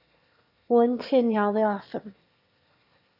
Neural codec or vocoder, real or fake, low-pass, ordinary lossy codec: autoencoder, 22.05 kHz, a latent of 192 numbers a frame, VITS, trained on one speaker; fake; 5.4 kHz; AAC, 48 kbps